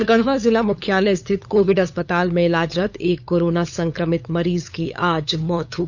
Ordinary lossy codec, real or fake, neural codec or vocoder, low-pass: none; fake; codec, 16 kHz, 8 kbps, FunCodec, trained on LibriTTS, 25 frames a second; 7.2 kHz